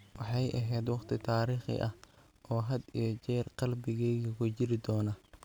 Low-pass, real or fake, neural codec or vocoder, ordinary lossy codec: none; real; none; none